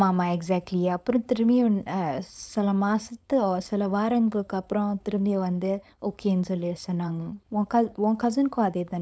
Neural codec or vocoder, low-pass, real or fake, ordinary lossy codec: codec, 16 kHz, 4.8 kbps, FACodec; none; fake; none